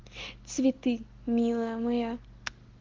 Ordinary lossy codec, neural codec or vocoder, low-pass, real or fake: Opus, 16 kbps; none; 7.2 kHz; real